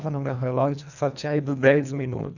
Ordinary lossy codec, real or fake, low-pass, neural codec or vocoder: none; fake; 7.2 kHz; codec, 24 kHz, 1.5 kbps, HILCodec